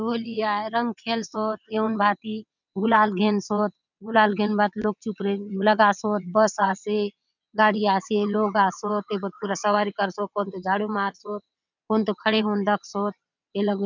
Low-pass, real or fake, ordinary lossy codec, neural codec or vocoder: 7.2 kHz; fake; none; vocoder, 22.05 kHz, 80 mel bands, WaveNeXt